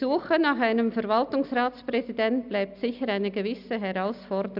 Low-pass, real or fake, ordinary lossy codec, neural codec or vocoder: 5.4 kHz; real; none; none